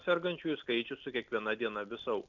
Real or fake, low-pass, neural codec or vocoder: real; 7.2 kHz; none